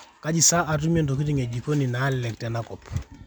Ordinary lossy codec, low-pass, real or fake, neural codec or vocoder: none; 19.8 kHz; real; none